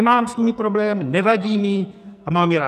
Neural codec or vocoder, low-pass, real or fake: codec, 44.1 kHz, 2.6 kbps, SNAC; 14.4 kHz; fake